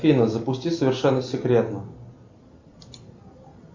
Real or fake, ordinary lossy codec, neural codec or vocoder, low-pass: real; MP3, 48 kbps; none; 7.2 kHz